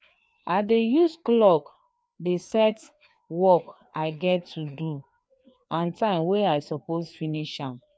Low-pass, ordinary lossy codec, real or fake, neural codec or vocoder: none; none; fake; codec, 16 kHz, 2 kbps, FreqCodec, larger model